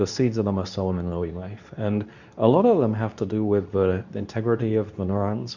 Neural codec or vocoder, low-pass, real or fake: codec, 24 kHz, 0.9 kbps, WavTokenizer, medium speech release version 2; 7.2 kHz; fake